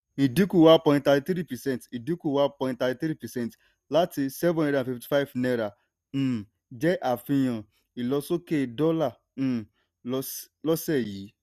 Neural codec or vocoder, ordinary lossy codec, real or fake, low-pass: none; none; real; 14.4 kHz